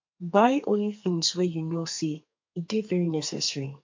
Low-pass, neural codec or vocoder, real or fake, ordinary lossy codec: 7.2 kHz; codec, 32 kHz, 1.9 kbps, SNAC; fake; MP3, 48 kbps